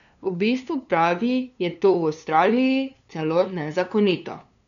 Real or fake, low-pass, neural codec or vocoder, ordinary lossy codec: fake; 7.2 kHz; codec, 16 kHz, 2 kbps, FunCodec, trained on LibriTTS, 25 frames a second; none